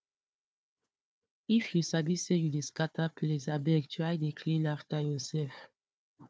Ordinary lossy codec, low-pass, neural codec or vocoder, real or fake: none; none; codec, 16 kHz, 4 kbps, FunCodec, trained on Chinese and English, 50 frames a second; fake